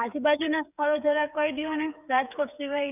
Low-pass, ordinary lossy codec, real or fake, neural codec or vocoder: 3.6 kHz; none; fake; codec, 16 kHz, 8 kbps, FreqCodec, smaller model